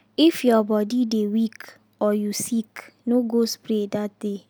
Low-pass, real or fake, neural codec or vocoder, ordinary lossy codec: none; real; none; none